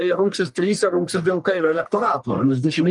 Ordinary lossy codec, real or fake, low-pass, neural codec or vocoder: Opus, 32 kbps; fake; 10.8 kHz; codec, 44.1 kHz, 1.7 kbps, Pupu-Codec